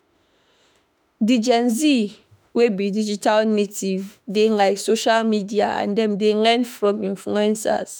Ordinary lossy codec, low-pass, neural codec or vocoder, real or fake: none; none; autoencoder, 48 kHz, 32 numbers a frame, DAC-VAE, trained on Japanese speech; fake